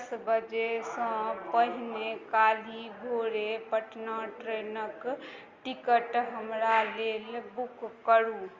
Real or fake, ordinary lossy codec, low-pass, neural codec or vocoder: real; Opus, 32 kbps; 7.2 kHz; none